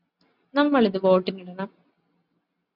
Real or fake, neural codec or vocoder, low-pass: real; none; 5.4 kHz